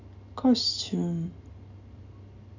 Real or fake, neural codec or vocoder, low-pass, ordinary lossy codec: real; none; 7.2 kHz; none